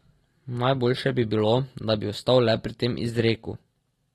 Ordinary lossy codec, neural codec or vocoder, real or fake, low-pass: AAC, 32 kbps; none; real; 19.8 kHz